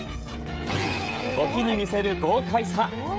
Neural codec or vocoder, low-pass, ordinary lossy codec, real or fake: codec, 16 kHz, 16 kbps, FreqCodec, smaller model; none; none; fake